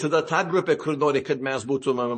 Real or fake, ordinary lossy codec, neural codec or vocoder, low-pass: fake; MP3, 32 kbps; vocoder, 44.1 kHz, 128 mel bands every 512 samples, BigVGAN v2; 10.8 kHz